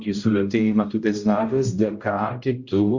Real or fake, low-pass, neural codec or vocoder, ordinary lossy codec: fake; 7.2 kHz; codec, 16 kHz, 0.5 kbps, X-Codec, HuBERT features, trained on general audio; Opus, 64 kbps